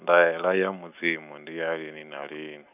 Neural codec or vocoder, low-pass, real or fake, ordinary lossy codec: none; 3.6 kHz; real; none